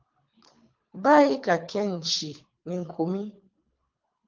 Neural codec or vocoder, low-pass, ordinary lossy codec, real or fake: codec, 24 kHz, 3 kbps, HILCodec; 7.2 kHz; Opus, 32 kbps; fake